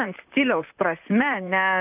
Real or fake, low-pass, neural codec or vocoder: fake; 3.6 kHz; vocoder, 44.1 kHz, 128 mel bands, Pupu-Vocoder